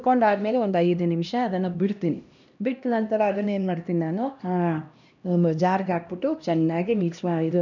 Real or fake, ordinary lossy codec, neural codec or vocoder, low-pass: fake; none; codec, 16 kHz, 1 kbps, X-Codec, HuBERT features, trained on LibriSpeech; 7.2 kHz